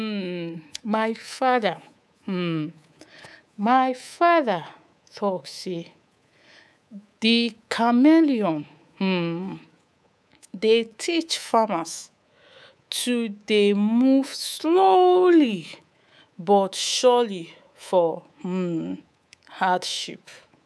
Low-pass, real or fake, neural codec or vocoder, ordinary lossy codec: none; fake; codec, 24 kHz, 3.1 kbps, DualCodec; none